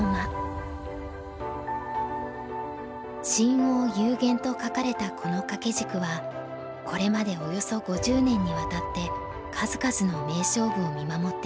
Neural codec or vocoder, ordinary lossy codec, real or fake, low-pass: none; none; real; none